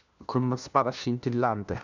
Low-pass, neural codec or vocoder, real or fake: 7.2 kHz; codec, 16 kHz, 1 kbps, FunCodec, trained on LibriTTS, 50 frames a second; fake